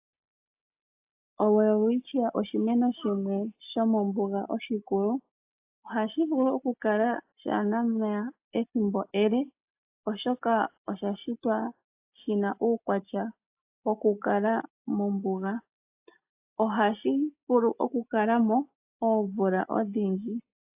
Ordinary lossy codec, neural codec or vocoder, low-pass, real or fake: AAC, 32 kbps; none; 3.6 kHz; real